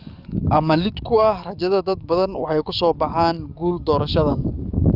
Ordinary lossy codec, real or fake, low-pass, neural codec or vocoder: Opus, 64 kbps; fake; 5.4 kHz; codec, 44.1 kHz, 7.8 kbps, DAC